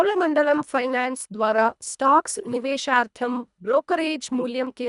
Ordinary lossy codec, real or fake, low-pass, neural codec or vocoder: none; fake; 10.8 kHz; codec, 24 kHz, 1.5 kbps, HILCodec